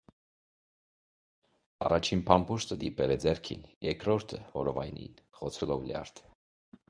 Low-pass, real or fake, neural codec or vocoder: 9.9 kHz; fake; codec, 24 kHz, 0.9 kbps, WavTokenizer, medium speech release version 1